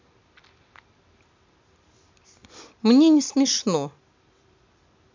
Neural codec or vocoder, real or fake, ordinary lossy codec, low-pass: none; real; MP3, 64 kbps; 7.2 kHz